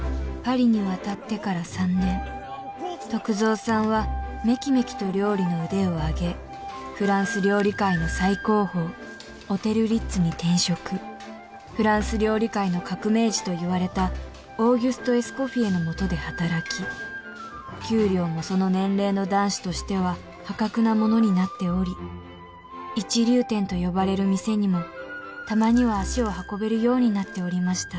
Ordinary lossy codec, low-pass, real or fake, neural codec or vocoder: none; none; real; none